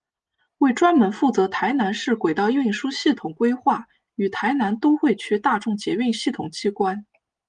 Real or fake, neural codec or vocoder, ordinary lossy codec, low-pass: real; none; Opus, 24 kbps; 9.9 kHz